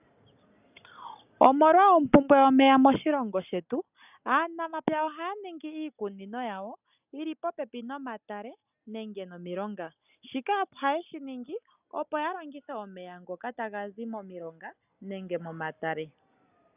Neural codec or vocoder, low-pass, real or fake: none; 3.6 kHz; real